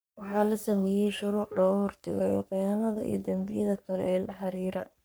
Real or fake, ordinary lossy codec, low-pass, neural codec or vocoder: fake; none; none; codec, 44.1 kHz, 3.4 kbps, Pupu-Codec